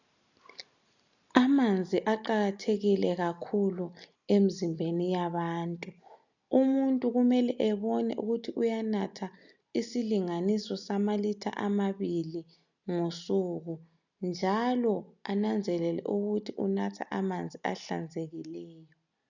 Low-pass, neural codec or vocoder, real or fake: 7.2 kHz; none; real